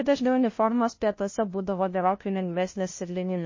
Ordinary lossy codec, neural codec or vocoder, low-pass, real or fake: MP3, 32 kbps; codec, 16 kHz, 0.5 kbps, FunCodec, trained on LibriTTS, 25 frames a second; 7.2 kHz; fake